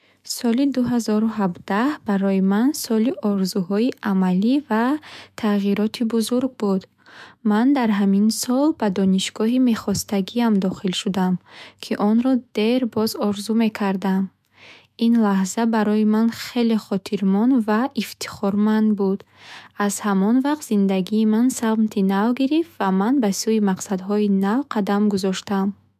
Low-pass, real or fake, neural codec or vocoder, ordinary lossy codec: 14.4 kHz; fake; autoencoder, 48 kHz, 128 numbers a frame, DAC-VAE, trained on Japanese speech; MP3, 96 kbps